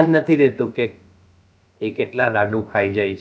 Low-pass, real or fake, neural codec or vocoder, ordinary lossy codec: none; fake; codec, 16 kHz, about 1 kbps, DyCAST, with the encoder's durations; none